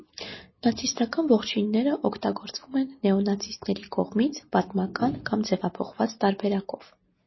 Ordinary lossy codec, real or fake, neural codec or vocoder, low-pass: MP3, 24 kbps; real; none; 7.2 kHz